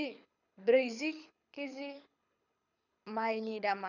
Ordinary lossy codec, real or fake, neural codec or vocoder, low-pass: none; fake; codec, 24 kHz, 6 kbps, HILCodec; 7.2 kHz